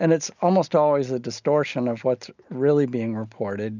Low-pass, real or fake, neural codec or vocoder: 7.2 kHz; real; none